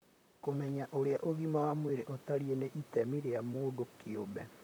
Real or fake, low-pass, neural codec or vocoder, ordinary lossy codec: fake; none; vocoder, 44.1 kHz, 128 mel bands, Pupu-Vocoder; none